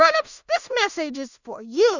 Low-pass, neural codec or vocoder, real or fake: 7.2 kHz; codec, 16 kHz in and 24 kHz out, 0.4 kbps, LongCat-Audio-Codec, two codebook decoder; fake